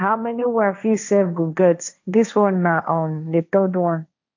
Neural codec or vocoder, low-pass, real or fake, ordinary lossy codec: codec, 16 kHz, 1.1 kbps, Voila-Tokenizer; 7.2 kHz; fake; AAC, 48 kbps